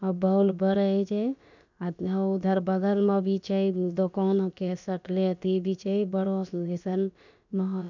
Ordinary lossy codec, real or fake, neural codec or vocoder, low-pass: none; fake; codec, 16 kHz, about 1 kbps, DyCAST, with the encoder's durations; 7.2 kHz